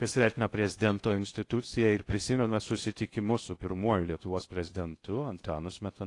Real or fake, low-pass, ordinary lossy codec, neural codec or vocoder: fake; 10.8 kHz; AAC, 48 kbps; codec, 16 kHz in and 24 kHz out, 0.6 kbps, FocalCodec, streaming, 2048 codes